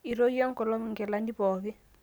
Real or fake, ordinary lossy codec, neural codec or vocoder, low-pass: real; none; none; none